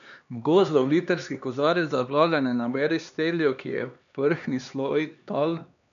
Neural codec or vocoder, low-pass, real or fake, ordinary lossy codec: codec, 16 kHz, 2 kbps, X-Codec, HuBERT features, trained on LibriSpeech; 7.2 kHz; fake; MP3, 96 kbps